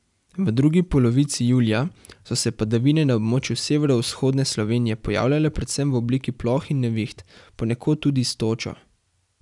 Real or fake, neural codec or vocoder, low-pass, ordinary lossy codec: real; none; 10.8 kHz; none